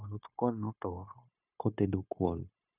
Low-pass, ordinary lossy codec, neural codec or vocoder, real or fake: 3.6 kHz; none; codec, 16 kHz, 0.9 kbps, LongCat-Audio-Codec; fake